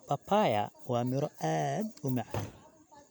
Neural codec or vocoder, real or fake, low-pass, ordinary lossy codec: none; real; none; none